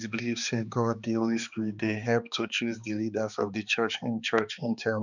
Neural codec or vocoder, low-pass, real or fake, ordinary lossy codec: codec, 16 kHz, 2 kbps, X-Codec, HuBERT features, trained on balanced general audio; 7.2 kHz; fake; none